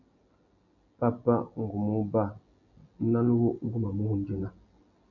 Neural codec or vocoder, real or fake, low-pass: none; real; 7.2 kHz